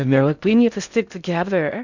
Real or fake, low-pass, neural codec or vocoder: fake; 7.2 kHz; codec, 16 kHz in and 24 kHz out, 0.6 kbps, FocalCodec, streaming, 2048 codes